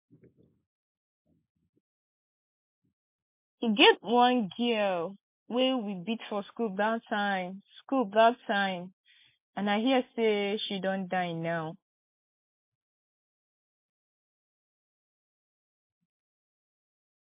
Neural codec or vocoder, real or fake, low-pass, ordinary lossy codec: none; real; 3.6 kHz; MP3, 24 kbps